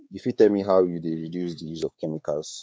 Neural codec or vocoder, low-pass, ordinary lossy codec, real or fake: codec, 16 kHz, 4 kbps, X-Codec, WavLM features, trained on Multilingual LibriSpeech; none; none; fake